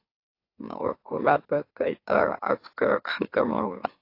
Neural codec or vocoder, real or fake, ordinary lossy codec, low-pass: autoencoder, 44.1 kHz, a latent of 192 numbers a frame, MeloTTS; fake; AAC, 32 kbps; 5.4 kHz